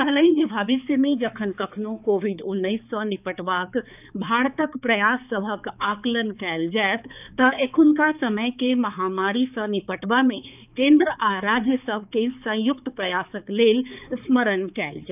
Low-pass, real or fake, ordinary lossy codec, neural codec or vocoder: 3.6 kHz; fake; none; codec, 16 kHz, 4 kbps, X-Codec, HuBERT features, trained on balanced general audio